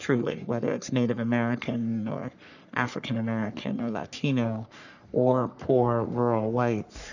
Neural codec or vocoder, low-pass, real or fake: codec, 44.1 kHz, 3.4 kbps, Pupu-Codec; 7.2 kHz; fake